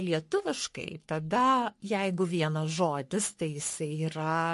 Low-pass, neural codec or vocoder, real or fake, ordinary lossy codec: 14.4 kHz; codec, 44.1 kHz, 3.4 kbps, Pupu-Codec; fake; MP3, 48 kbps